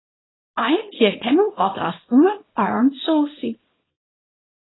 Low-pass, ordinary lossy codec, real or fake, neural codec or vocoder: 7.2 kHz; AAC, 16 kbps; fake; codec, 24 kHz, 0.9 kbps, WavTokenizer, small release